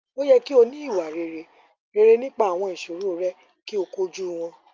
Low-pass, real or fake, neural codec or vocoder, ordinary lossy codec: 7.2 kHz; real; none; Opus, 24 kbps